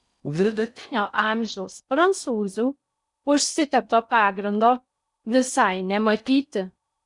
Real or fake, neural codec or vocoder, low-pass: fake; codec, 16 kHz in and 24 kHz out, 0.6 kbps, FocalCodec, streaming, 4096 codes; 10.8 kHz